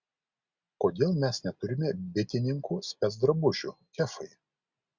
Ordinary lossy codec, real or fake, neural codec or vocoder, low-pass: Opus, 64 kbps; real; none; 7.2 kHz